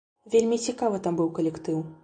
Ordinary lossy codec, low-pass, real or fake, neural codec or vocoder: AAC, 64 kbps; 9.9 kHz; real; none